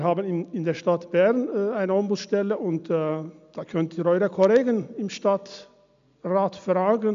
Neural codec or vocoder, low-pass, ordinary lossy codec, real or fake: none; 7.2 kHz; MP3, 64 kbps; real